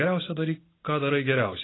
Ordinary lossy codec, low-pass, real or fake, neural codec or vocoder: AAC, 16 kbps; 7.2 kHz; real; none